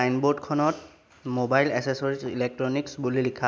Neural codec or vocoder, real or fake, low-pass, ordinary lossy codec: none; real; none; none